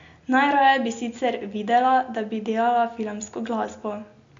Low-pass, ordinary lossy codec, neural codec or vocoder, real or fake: 7.2 kHz; MP3, 48 kbps; none; real